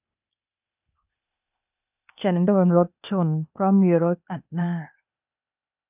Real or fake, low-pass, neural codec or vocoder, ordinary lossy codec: fake; 3.6 kHz; codec, 16 kHz, 0.8 kbps, ZipCodec; none